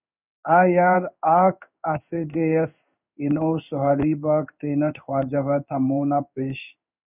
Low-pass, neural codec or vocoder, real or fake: 3.6 kHz; codec, 16 kHz in and 24 kHz out, 1 kbps, XY-Tokenizer; fake